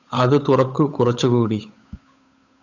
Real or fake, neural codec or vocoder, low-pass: fake; codec, 16 kHz, 8 kbps, FunCodec, trained on Chinese and English, 25 frames a second; 7.2 kHz